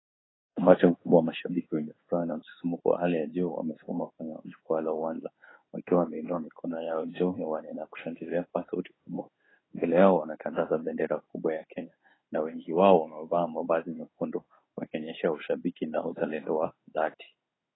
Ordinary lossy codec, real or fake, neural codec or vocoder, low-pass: AAC, 16 kbps; fake; codec, 16 kHz in and 24 kHz out, 1 kbps, XY-Tokenizer; 7.2 kHz